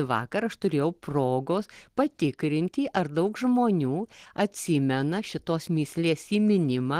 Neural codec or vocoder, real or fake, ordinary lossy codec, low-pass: none; real; Opus, 16 kbps; 14.4 kHz